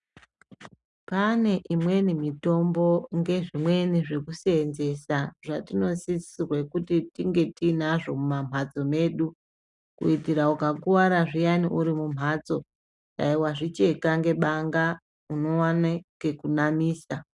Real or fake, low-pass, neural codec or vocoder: real; 10.8 kHz; none